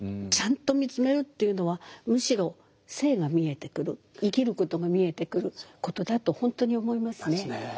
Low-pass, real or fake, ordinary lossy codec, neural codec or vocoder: none; real; none; none